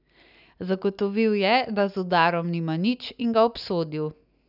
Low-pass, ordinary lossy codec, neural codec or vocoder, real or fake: 5.4 kHz; none; none; real